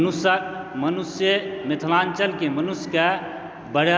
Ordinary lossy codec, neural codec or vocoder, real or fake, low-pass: none; none; real; none